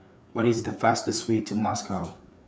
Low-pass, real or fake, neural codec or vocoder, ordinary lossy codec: none; fake; codec, 16 kHz, 4 kbps, FreqCodec, larger model; none